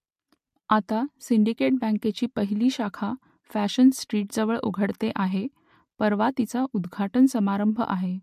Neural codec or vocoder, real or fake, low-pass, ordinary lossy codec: none; real; 14.4 kHz; MP3, 64 kbps